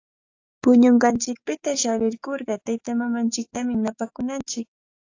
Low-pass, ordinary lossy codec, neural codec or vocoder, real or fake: 7.2 kHz; AAC, 48 kbps; codec, 16 kHz, 6 kbps, DAC; fake